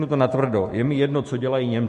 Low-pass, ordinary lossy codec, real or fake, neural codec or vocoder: 14.4 kHz; MP3, 48 kbps; fake; autoencoder, 48 kHz, 128 numbers a frame, DAC-VAE, trained on Japanese speech